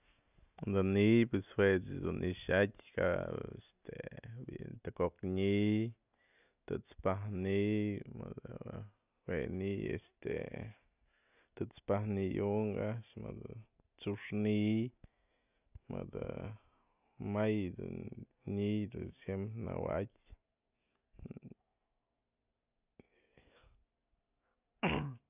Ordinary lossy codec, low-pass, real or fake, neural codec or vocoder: none; 3.6 kHz; real; none